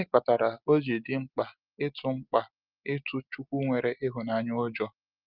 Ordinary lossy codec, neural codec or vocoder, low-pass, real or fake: Opus, 32 kbps; none; 5.4 kHz; real